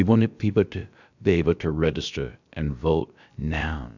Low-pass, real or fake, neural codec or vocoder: 7.2 kHz; fake; codec, 16 kHz, about 1 kbps, DyCAST, with the encoder's durations